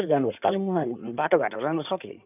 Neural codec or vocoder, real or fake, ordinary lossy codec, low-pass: codec, 16 kHz in and 24 kHz out, 2.2 kbps, FireRedTTS-2 codec; fake; none; 3.6 kHz